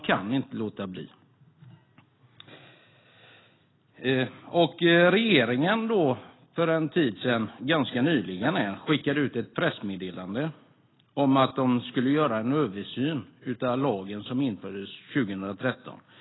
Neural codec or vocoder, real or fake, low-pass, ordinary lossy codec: none; real; 7.2 kHz; AAC, 16 kbps